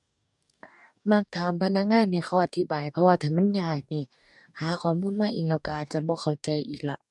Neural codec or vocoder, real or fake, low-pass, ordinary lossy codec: codec, 44.1 kHz, 2.6 kbps, DAC; fake; 10.8 kHz; none